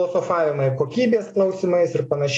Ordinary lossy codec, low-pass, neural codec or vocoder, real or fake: AAC, 32 kbps; 10.8 kHz; none; real